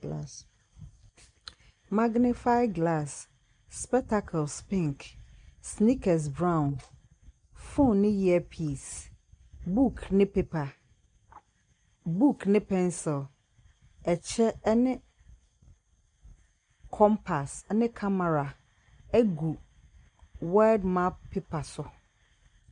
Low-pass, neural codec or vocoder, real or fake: 9.9 kHz; none; real